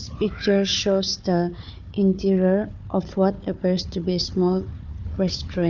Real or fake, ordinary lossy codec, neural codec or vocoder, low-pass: fake; none; codec, 16 kHz, 4 kbps, FunCodec, trained on Chinese and English, 50 frames a second; 7.2 kHz